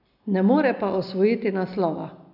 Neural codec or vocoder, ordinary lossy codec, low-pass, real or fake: none; none; 5.4 kHz; real